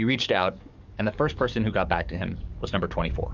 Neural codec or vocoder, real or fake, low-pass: codec, 44.1 kHz, 7.8 kbps, DAC; fake; 7.2 kHz